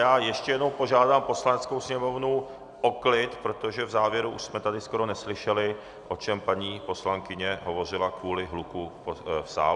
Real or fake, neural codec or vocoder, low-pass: real; none; 10.8 kHz